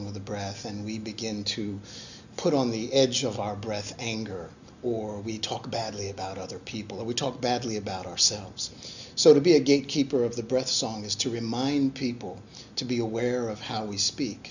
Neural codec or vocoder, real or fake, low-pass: none; real; 7.2 kHz